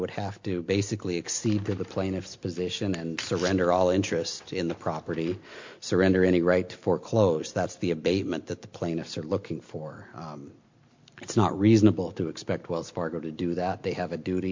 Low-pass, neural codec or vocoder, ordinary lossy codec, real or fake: 7.2 kHz; none; MP3, 48 kbps; real